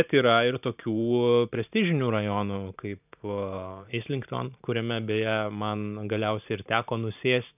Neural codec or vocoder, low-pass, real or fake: vocoder, 44.1 kHz, 128 mel bands every 512 samples, BigVGAN v2; 3.6 kHz; fake